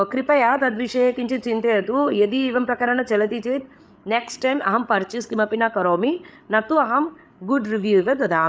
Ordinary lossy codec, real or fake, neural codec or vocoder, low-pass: none; fake; codec, 16 kHz, 8 kbps, FreqCodec, larger model; none